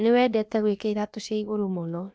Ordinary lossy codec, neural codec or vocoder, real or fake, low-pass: none; codec, 16 kHz, about 1 kbps, DyCAST, with the encoder's durations; fake; none